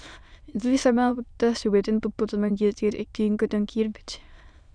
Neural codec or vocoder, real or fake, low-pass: autoencoder, 22.05 kHz, a latent of 192 numbers a frame, VITS, trained on many speakers; fake; 9.9 kHz